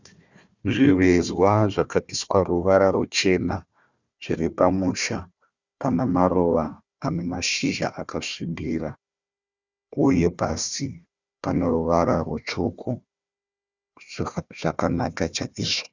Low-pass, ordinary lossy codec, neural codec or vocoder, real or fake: 7.2 kHz; Opus, 64 kbps; codec, 16 kHz, 1 kbps, FunCodec, trained on Chinese and English, 50 frames a second; fake